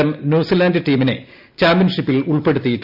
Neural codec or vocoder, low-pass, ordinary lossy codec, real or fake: none; 5.4 kHz; none; real